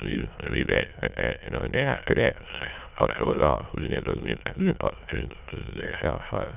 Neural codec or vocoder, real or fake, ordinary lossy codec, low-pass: autoencoder, 22.05 kHz, a latent of 192 numbers a frame, VITS, trained on many speakers; fake; none; 3.6 kHz